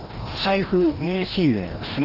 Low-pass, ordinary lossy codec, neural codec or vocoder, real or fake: 5.4 kHz; Opus, 16 kbps; codec, 16 kHz, 1 kbps, FreqCodec, larger model; fake